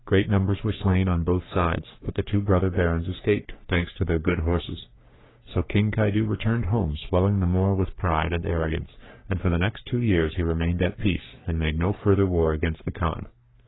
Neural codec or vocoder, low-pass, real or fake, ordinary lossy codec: codec, 44.1 kHz, 3.4 kbps, Pupu-Codec; 7.2 kHz; fake; AAC, 16 kbps